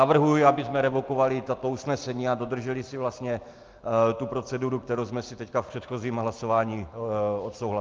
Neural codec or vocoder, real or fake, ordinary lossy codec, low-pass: none; real; Opus, 32 kbps; 7.2 kHz